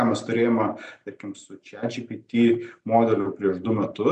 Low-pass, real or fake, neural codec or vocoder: 9.9 kHz; real; none